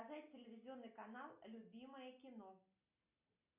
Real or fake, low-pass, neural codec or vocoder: real; 3.6 kHz; none